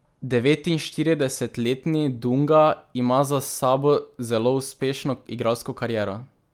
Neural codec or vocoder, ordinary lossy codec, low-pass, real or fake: none; Opus, 32 kbps; 19.8 kHz; real